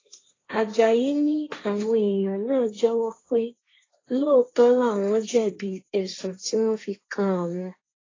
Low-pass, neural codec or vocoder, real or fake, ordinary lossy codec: 7.2 kHz; codec, 16 kHz, 1.1 kbps, Voila-Tokenizer; fake; AAC, 32 kbps